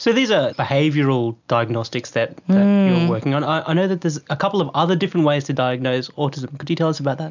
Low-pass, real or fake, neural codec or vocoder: 7.2 kHz; real; none